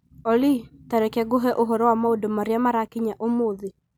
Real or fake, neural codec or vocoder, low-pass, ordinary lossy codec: real; none; none; none